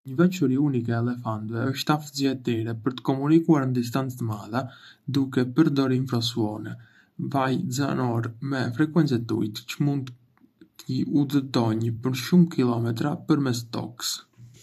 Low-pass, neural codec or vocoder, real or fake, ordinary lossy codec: 14.4 kHz; vocoder, 44.1 kHz, 128 mel bands every 512 samples, BigVGAN v2; fake; none